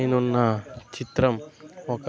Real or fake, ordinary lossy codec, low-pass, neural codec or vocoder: real; none; none; none